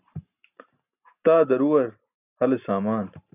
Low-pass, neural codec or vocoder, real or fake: 3.6 kHz; none; real